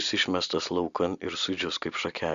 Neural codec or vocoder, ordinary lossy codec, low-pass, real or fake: none; Opus, 64 kbps; 7.2 kHz; real